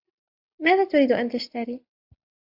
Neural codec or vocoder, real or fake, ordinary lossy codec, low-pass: none; real; AAC, 48 kbps; 5.4 kHz